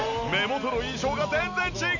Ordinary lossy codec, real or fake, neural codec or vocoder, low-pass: none; real; none; 7.2 kHz